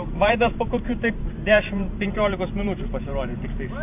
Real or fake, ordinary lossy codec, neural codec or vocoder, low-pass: fake; AAC, 32 kbps; vocoder, 44.1 kHz, 128 mel bands every 512 samples, BigVGAN v2; 3.6 kHz